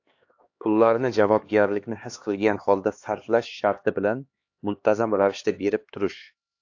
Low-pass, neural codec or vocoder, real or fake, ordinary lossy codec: 7.2 kHz; codec, 16 kHz, 2 kbps, X-Codec, HuBERT features, trained on LibriSpeech; fake; AAC, 48 kbps